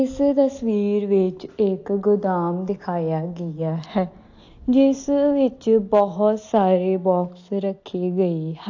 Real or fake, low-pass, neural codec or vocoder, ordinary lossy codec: real; 7.2 kHz; none; AAC, 48 kbps